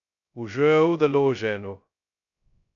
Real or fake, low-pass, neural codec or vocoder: fake; 7.2 kHz; codec, 16 kHz, 0.2 kbps, FocalCodec